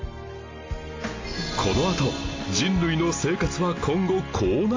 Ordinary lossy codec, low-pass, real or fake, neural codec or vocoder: AAC, 32 kbps; 7.2 kHz; real; none